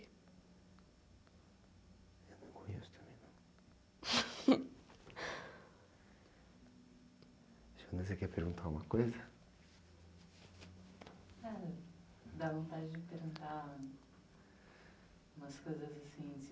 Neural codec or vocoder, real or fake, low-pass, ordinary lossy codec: none; real; none; none